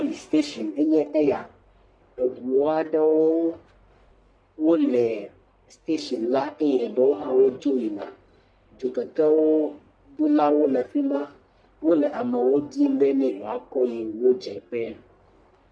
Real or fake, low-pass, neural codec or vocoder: fake; 9.9 kHz; codec, 44.1 kHz, 1.7 kbps, Pupu-Codec